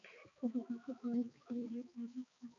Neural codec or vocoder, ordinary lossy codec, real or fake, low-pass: codec, 16 kHz, 4 kbps, X-Codec, HuBERT features, trained on balanced general audio; MP3, 64 kbps; fake; 7.2 kHz